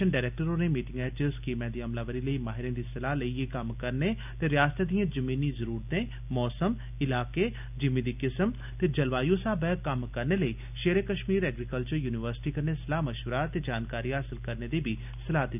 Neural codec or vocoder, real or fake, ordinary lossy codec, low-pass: none; real; none; 3.6 kHz